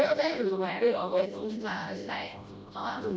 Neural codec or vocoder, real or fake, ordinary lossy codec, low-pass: codec, 16 kHz, 0.5 kbps, FreqCodec, smaller model; fake; none; none